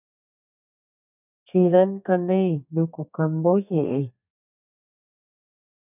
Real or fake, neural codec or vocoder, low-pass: fake; codec, 32 kHz, 1.9 kbps, SNAC; 3.6 kHz